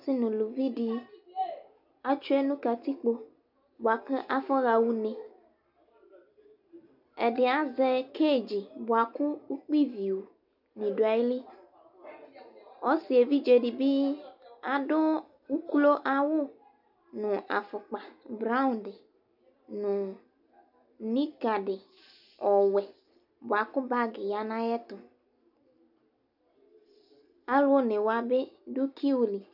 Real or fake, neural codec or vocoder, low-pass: real; none; 5.4 kHz